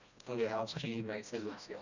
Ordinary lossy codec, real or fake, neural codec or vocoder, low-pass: none; fake; codec, 16 kHz, 1 kbps, FreqCodec, smaller model; 7.2 kHz